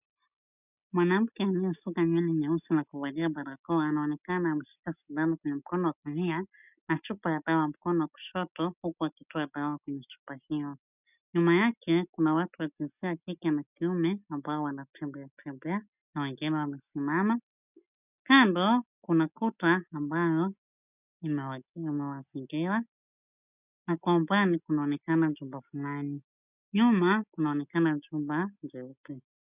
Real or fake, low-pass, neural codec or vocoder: real; 3.6 kHz; none